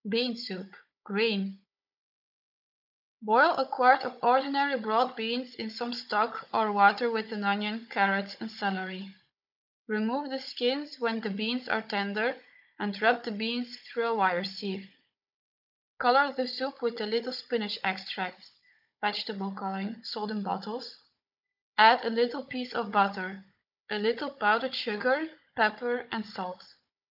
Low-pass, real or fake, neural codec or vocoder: 5.4 kHz; fake; codec, 16 kHz, 16 kbps, FunCodec, trained on Chinese and English, 50 frames a second